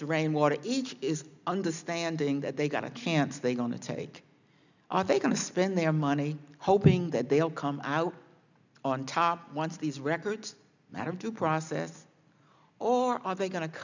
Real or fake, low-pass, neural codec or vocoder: real; 7.2 kHz; none